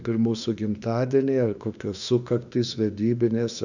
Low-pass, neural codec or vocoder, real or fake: 7.2 kHz; autoencoder, 48 kHz, 32 numbers a frame, DAC-VAE, trained on Japanese speech; fake